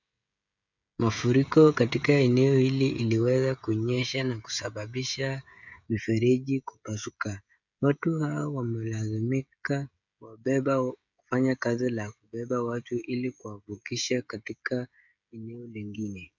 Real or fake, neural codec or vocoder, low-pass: fake; codec, 16 kHz, 16 kbps, FreqCodec, smaller model; 7.2 kHz